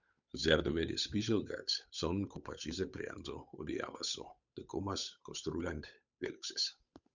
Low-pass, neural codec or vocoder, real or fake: 7.2 kHz; codec, 16 kHz, 8 kbps, FunCodec, trained on Chinese and English, 25 frames a second; fake